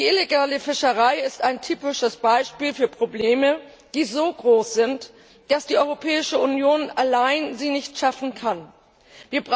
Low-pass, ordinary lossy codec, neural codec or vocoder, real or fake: none; none; none; real